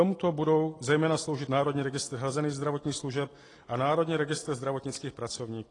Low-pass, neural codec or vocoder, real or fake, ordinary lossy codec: 10.8 kHz; none; real; AAC, 32 kbps